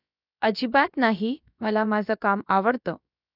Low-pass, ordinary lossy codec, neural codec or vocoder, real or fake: 5.4 kHz; none; codec, 16 kHz, about 1 kbps, DyCAST, with the encoder's durations; fake